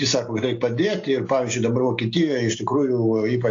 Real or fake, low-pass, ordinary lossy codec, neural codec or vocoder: real; 7.2 kHz; AAC, 48 kbps; none